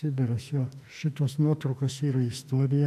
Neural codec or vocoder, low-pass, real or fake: autoencoder, 48 kHz, 32 numbers a frame, DAC-VAE, trained on Japanese speech; 14.4 kHz; fake